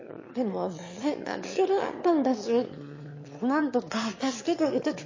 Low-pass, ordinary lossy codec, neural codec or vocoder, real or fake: 7.2 kHz; MP3, 32 kbps; autoencoder, 22.05 kHz, a latent of 192 numbers a frame, VITS, trained on one speaker; fake